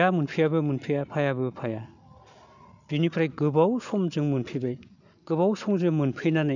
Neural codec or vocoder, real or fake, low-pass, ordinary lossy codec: none; real; 7.2 kHz; none